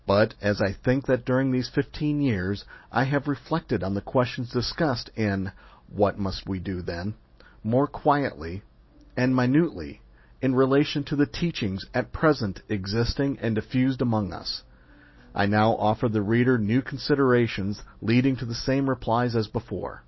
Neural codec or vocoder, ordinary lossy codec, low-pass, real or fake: none; MP3, 24 kbps; 7.2 kHz; real